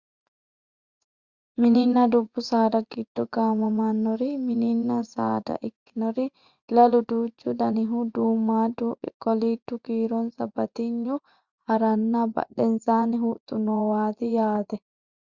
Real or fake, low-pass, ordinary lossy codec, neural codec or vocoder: fake; 7.2 kHz; Opus, 64 kbps; vocoder, 24 kHz, 100 mel bands, Vocos